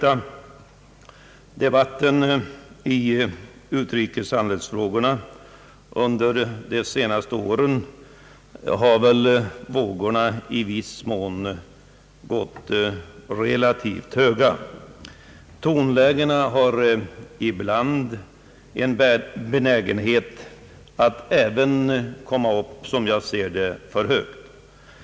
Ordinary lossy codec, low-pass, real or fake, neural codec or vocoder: none; none; real; none